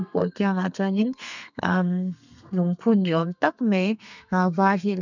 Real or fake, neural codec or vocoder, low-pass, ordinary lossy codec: fake; codec, 32 kHz, 1.9 kbps, SNAC; 7.2 kHz; none